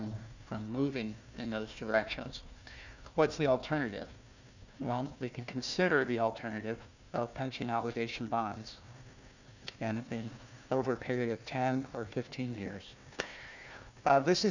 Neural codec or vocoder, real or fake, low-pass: codec, 16 kHz, 1 kbps, FunCodec, trained on Chinese and English, 50 frames a second; fake; 7.2 kHz